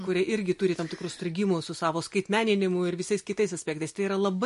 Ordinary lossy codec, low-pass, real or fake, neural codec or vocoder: MP3, 48 kbps; 14.4 kHz; real; none